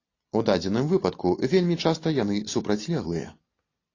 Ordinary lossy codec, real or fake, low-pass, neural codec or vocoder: AAC, 32 kbps; real; 7.2 kHz; none